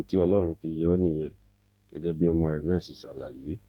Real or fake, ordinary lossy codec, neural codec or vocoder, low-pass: fake; none; codec, 44.1 kHz, 2.6 kbps, DAC; 19.8 kHz